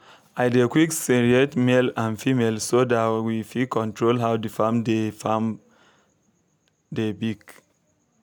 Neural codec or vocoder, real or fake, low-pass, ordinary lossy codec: none; real; none; none